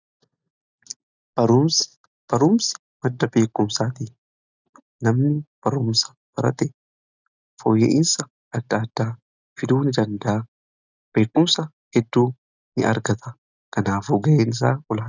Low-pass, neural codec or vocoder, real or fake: 7.2 kHz; none; real